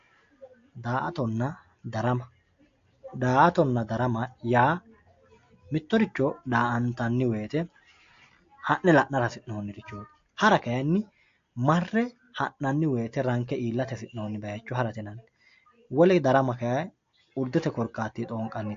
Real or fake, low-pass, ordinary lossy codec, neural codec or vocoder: real; 7.2 kHz; AAC, 48 kbps; none